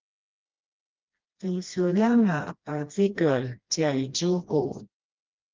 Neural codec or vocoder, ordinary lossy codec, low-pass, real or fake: codec, 16 kHz, 1 kbps, FreqCodec, smaller model; Opus, 32 kbps; 7.2 kHz; fake